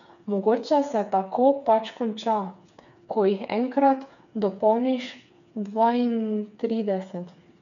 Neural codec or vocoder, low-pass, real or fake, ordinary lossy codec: codec, 16 kHz, 4 kbps, FreqCodec, smaller model; 7.2 kHz; fake; none